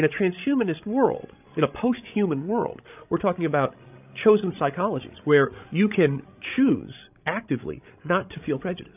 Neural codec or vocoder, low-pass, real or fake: codec, 16 kHz, 16 kbps, FreqCodec, larger model; 3.6 kHz; fake